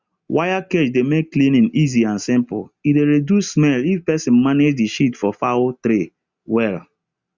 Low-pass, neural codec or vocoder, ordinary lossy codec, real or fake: 7.2 kHz; none; Opus, 64 kbps; real